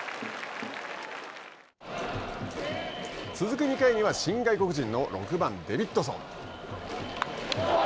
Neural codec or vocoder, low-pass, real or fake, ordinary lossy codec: none; none; real; none